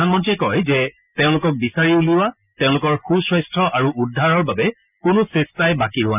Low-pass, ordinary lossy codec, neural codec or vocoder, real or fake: 3.6 kHz; none; none; real